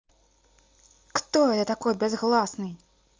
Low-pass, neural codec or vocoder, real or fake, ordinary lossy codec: 7.2 kHz; none; real; Opus, 32 kbps